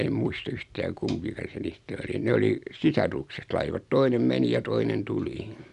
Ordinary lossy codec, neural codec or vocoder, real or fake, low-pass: none; none; real; 10.8 kHz